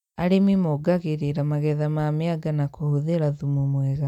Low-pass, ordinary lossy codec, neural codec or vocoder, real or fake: 19.8 kHz; none; none; real